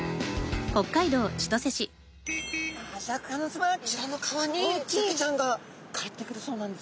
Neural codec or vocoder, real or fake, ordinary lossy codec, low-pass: none; real; none; none